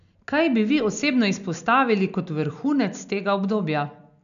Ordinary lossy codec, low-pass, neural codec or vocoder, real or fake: none; 7.2 kHz; none; real